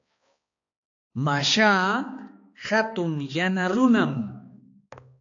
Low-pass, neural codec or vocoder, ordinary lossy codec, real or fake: 7.2 kHz; codec, 16 kHz, 2 kbps, X-Codec, HuBERT features, trained on balanced general audio; AAC, 48 kbps; fake